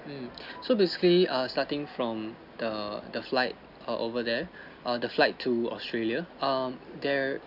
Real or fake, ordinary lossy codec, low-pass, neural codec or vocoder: real; none; 5.4 kHz; none